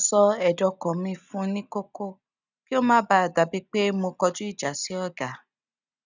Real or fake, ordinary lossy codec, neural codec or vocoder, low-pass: real; none; none; 7.2 kHz